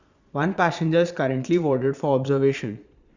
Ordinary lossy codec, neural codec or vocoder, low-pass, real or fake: Opus, 64 kbps; vocoder, 22.05 kHz, 80 mel bands, Vocos; 7.2 kHz; fake